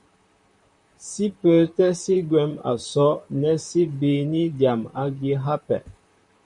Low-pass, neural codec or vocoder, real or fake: 10.8 kHz; vocoder, 44.1 kHz, 128 mel bands, Pupu-Vocoder; fake